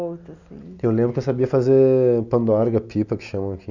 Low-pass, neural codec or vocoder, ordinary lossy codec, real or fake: 7.2 kHz; none; none; real